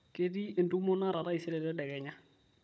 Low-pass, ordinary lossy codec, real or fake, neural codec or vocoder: none; none; fake; codec, 16 kHz, 16 kbps, FunCodec, trained on Chinese and English, 50 frames a second